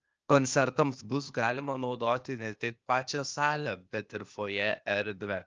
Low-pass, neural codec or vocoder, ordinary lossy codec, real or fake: 7.2 kHz; codec, 16 kHz, 0.8 kbps, ZipCodec; Opus, 24 kbps; fake